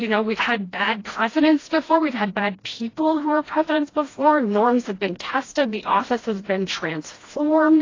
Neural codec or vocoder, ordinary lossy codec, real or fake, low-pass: codec, 16 kHz, 1 kbps, FreqCodec, smaller model; AAC, 32 kbps; fake; 7.2 kHz